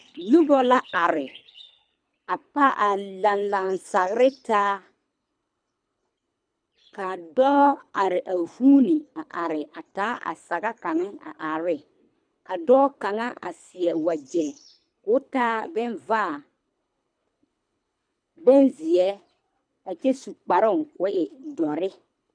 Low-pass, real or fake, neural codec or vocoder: 9.9 kHz; fake; codec, 24 kHz, 3 kbps, HILCodec